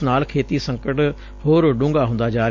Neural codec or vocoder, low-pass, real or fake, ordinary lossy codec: none; 7.2 kHz; real; MP3, 48 kbps